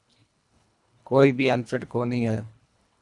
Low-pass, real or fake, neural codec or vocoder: 10.8 kHz; fake; codec, 24 kHz, 1.5 kbps, HILCodec